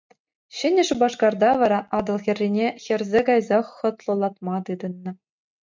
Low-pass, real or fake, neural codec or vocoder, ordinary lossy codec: 7.2 kHz; real; none; MP3, 64 kbps